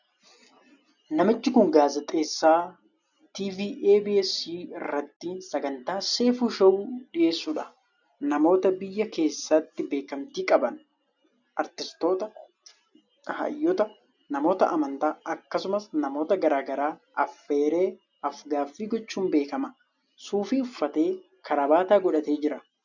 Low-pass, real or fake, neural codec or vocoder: 7.2 kHz; real; none